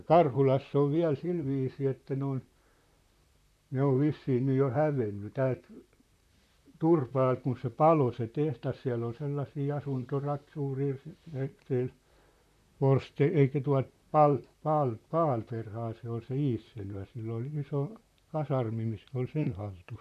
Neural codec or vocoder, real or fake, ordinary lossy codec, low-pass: vocoder, 44.1 kHz, 128 mel bands, Pupu-Vocoder; fake; none; 14.4 kHz